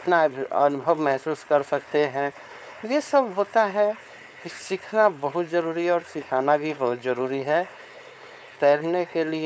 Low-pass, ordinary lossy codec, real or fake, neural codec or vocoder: none; none; fake; codec, 16 kHz, 4.8 kbps, FACodec